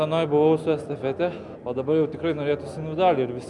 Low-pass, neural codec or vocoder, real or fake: 10.8 kHz; none; real